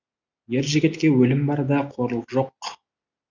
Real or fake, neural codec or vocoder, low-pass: real; none; 7.2 kHz